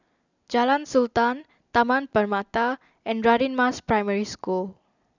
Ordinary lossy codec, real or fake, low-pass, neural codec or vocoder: none; real; 7.2 kHz; none